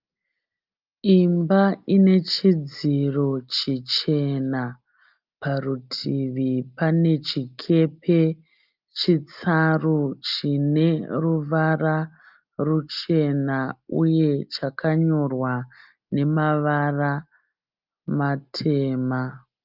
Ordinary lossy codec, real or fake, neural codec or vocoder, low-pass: Opus, 24 kbps; real; none; 5.4 kHz